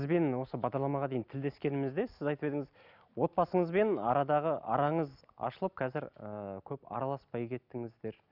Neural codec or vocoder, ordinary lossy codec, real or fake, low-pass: none; none; real; 5.4 kHz